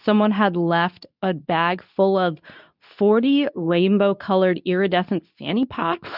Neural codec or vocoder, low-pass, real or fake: codec, 24 kHz, 0.9 kbps, WavTokenizer, medium speech release version 2; 5.4 kHz; fake